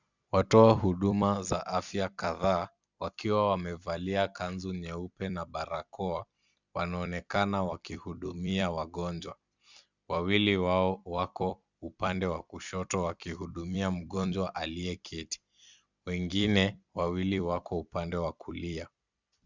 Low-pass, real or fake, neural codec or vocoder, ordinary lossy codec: 7.2 kHz; fake; vocoder, 44.1 kHz, 128 mel bands every 256 samples, BigVGAN v2; Opus, 64 kbps